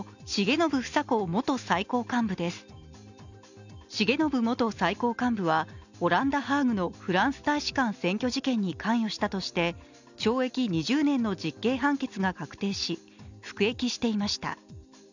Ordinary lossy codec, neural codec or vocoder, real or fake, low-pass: none; none; real; 7.2 kHz